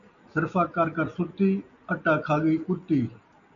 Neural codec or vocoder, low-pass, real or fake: none; 7.2 kHz; real